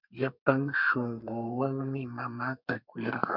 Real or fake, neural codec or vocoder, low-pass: fake; codec, 32 kHz, 1.9 kbps, SNAC; 5.4 kHz